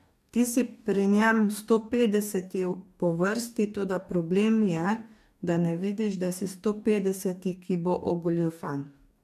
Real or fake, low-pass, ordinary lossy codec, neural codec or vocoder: fake; 14.4 kHz; AAC, 96 kbps; codec, 44.1 kHz, 2.6 kbps, DAC